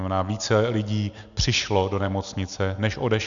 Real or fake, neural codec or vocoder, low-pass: real; none; 7.2 kHz